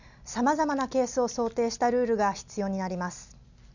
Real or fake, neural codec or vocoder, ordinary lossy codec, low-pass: real; none; none; 7.2 kHz